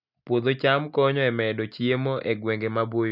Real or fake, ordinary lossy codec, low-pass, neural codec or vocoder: real; none; 5.4 kHz; none